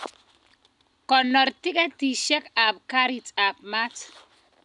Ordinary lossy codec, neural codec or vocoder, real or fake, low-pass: none; none; real; 10.8 kHz